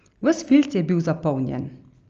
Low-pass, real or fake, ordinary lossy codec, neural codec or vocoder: 7.2 kHz; real; Opus, 24 kbps; none